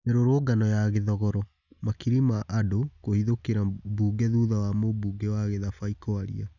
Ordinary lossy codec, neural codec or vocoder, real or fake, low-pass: none; none; real; 7.2 kHz